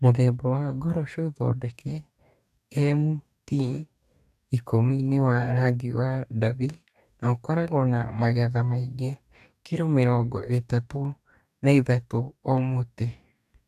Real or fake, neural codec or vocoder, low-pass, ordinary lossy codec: fake; codec, 44.1 kHz, 2.6 kbps, DAC; 14.4 kHz; none